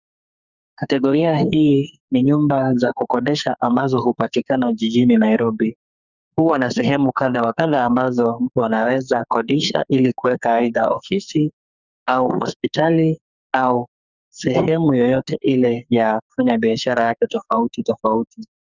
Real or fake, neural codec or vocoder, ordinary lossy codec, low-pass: fake; codec, 44.1 kHz, 2.6 kbps, SNAC; Opus, 64 kbps; 7.2 kHz